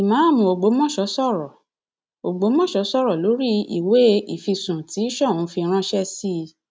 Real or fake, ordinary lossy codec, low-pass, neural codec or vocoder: real; none; none; none